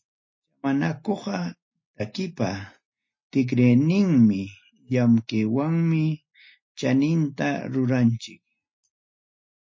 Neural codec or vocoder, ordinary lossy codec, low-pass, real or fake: none; MP3, 32 kbps; 7.2 kHz; real